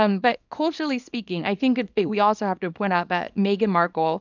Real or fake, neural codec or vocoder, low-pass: fake; codec, 24 kHz, 0.9 kbps, WavTokenizer, small release; 7.2 kHz